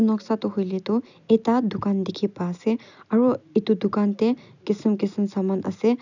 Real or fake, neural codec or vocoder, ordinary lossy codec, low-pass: real; none; none; 7.2 kHz